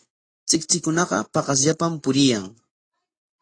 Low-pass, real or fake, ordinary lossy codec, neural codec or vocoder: 9.9 kHz; real; AAC, 32 kbps; none